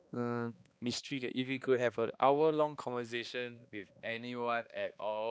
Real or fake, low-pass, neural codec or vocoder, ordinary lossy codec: fake; none; codec, 16 kHz, 2 kbps, X-Codec, HuBERT features, trained on balanced general audio; none